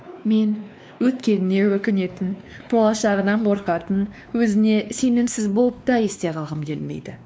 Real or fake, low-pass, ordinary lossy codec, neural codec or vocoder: fake; none; none; codec, 16 kHz, 2 kbps, X-Codec, WavLM features, trained on Multilingual LibriSpeech